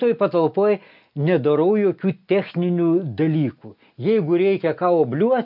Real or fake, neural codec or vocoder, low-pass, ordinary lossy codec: real; none; 5.4 kHz; AAC, 48 kbps